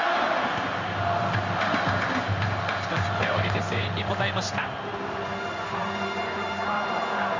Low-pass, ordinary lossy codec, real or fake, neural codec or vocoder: 7.2 kHz; MP3, 64 kbps; fake; codec, 16 kHz in and 24 kHz out, 1 kbps, XY-Tokenizer